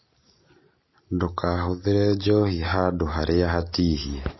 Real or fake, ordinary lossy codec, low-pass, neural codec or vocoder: real; MP3, 24 kbps; 7.2 kHz; none